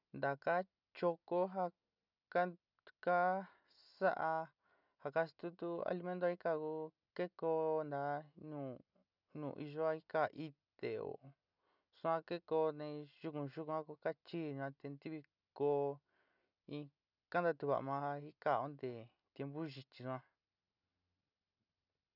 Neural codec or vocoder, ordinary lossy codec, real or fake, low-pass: none; none; real; 5.4 kHz